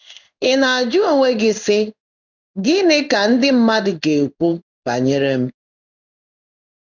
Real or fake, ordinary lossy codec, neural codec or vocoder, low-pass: fake; none; codec, 16 kHz in and 24 kHz out, 1 kbps, XY-Tokenizer; 7.2 kHz